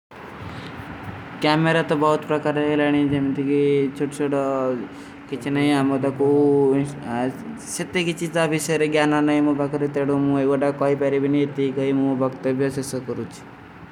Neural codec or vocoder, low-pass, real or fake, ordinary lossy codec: none; 19.8 kHz; real; none